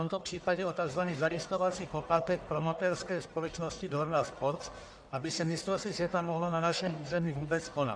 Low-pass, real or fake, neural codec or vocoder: 9.9 kHz; fake; codec, 44.1 kHz, 1.7 kbps, Pupu-Codec